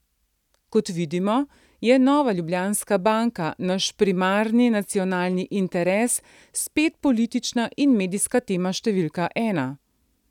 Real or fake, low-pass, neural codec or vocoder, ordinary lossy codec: real; 19.8 kHz; none; none